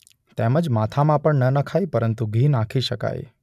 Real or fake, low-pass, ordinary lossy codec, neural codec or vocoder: real; 14.4 kHz; none; none